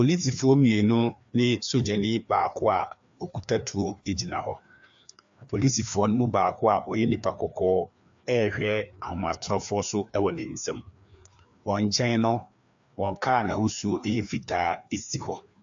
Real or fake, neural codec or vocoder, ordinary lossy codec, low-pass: fake; codec, 16 kHz, 2 kbps, FreqCodec, larger model; AAC, 64 kbps; 7.2 kHz